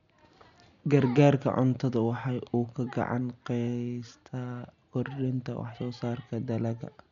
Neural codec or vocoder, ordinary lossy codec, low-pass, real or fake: none; none; 7.2 kHz; real